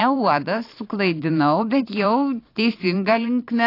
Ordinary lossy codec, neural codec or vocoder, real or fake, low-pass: AAC, 32 kbps; vocoder, 44.1 kHz, 128 mel bands every 512 samples, BigVGAN v2; fake; 5.4 kHz